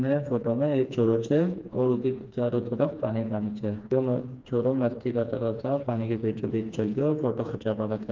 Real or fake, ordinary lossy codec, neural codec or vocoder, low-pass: fake; Opus, 24 kbps; codec, 16 kHz, 2 kbps, FreqCodec, smaller model; 7.2 kHz